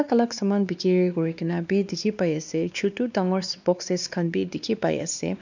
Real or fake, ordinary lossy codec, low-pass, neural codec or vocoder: fake; none; 7.2 kHz; codec, 16 kHz, 2 kbps, X-Codec, WavLM features, trained on Multilingual LibriSpeech